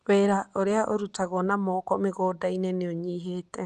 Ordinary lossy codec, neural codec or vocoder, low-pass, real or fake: Opus, 32 kbps; none; 10.8 kHz; real